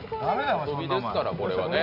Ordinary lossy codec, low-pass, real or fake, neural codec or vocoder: none; 5.4 kHz; real; none